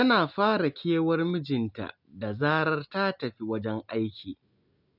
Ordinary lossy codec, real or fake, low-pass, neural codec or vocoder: none; real; 5.4 kHz; none